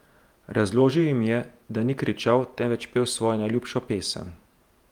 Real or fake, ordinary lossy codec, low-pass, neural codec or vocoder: fake; Opus, 32 kbps; 19.8 kHz; vocoder, 48 kHz, 128 mel bands, Vocos